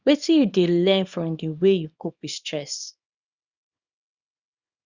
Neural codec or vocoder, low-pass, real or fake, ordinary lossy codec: codec, 24 kHz, 0.9 kbps, WavTokenizer, small release; 7.2 kHz; fake; Opus, 64 kbps